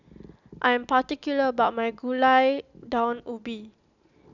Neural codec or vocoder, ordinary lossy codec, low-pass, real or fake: none; none; 7.2 kHz; real